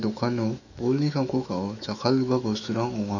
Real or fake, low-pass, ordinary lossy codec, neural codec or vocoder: fake; 7.2 kHz; none; autoencoder, 48 kHz, 128 numbers a frame, DAC-VAE, trained on Japanese speech